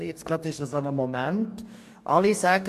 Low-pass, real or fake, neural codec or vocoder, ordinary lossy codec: 14.4 kHz; fake; codec, 44.1 kHz, 2.6 kbps, DAC; MP3, 64 kbps